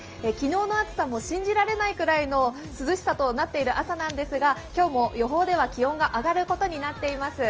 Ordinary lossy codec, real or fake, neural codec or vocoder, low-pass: Opus, 24 kbps; real; none; 7.2 kHz